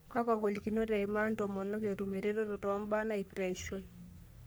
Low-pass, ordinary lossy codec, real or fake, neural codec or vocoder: none; none; fake; codec, 44.1 kHz, 3.4 kbps, Pupu-Codec